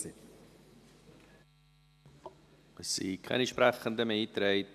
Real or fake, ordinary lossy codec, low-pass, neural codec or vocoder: real; MP3, 96 kbps; 14.4 kHz; none